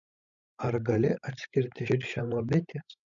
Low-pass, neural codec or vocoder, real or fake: 7.2 kHz; codec, 16 kHz, 16 kbps, FreqCodec, larger model; fake